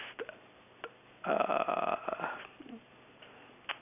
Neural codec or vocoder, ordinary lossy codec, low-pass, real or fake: none; none; 3.6 kHz; real